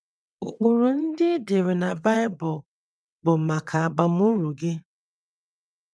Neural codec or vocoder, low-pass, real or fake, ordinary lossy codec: vocoder, 22.05 kHz, 80 mel bands, WaveNeXt; none; fake; none